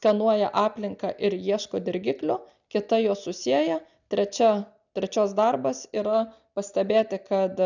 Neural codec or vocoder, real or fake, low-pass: none; real; 7.2 kHz